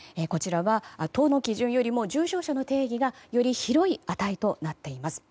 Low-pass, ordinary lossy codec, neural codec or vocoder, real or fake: none; none; none; real